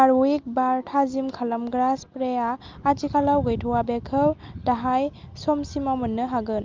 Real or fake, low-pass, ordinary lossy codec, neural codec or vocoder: real; 7.2 kHz; Opus, 32 kbps; none